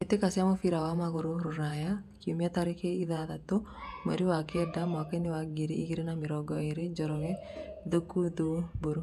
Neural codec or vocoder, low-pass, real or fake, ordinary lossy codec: vocoder, 48 kHz, 128 mel bands, Vocos; 14.4 kHz; fake; none